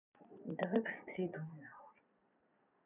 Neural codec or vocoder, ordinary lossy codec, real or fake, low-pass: vocoder, 22.05 kHz, 80 mel bands, Vocos; none; fake; 3.6 kHz